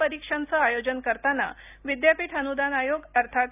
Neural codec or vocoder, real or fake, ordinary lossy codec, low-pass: none; real; none; 3.6 kHz